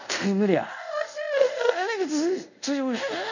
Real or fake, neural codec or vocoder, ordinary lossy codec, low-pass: fake; codec, 24 kHz, 0.5 kbps, DualCodec; none; 7.2 kHz